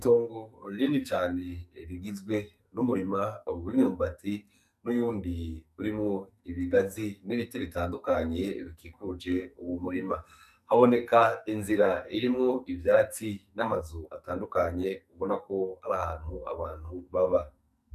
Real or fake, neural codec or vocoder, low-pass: fake; codec, 44.1 kHz, 2.6 kbps, SNAC; 14.4 kHz